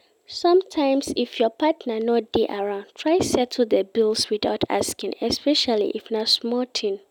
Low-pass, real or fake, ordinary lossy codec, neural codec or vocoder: 19.8 kHz; real; none; none